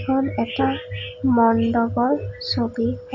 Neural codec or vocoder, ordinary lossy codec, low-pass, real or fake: none; none; 7.2 kHz; real